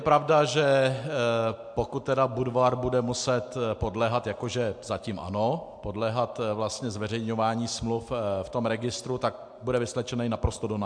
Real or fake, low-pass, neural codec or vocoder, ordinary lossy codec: real; 9.9 kHz; none; MP3, 64 kbps